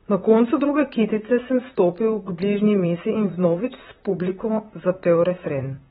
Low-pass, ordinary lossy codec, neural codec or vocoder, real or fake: 19.8 kHz; AAC, 16 kbps; vocoder, 44.1 kHz, 128 mel bands, Pupu-Vocoder; fake